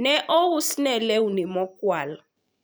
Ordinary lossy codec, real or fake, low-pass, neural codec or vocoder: none; fake; none; vocoder, 44.1 kHz, 128 mel bands every 512 samples, BigVGAN v2